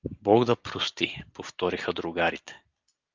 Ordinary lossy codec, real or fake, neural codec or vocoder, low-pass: Opus, 24 kbps; real; none; 7.2 kHz